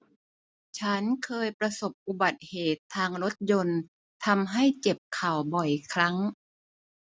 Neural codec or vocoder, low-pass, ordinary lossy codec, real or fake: none; none; none; real